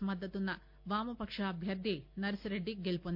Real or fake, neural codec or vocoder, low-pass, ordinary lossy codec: real; none; 5.4 kHz; none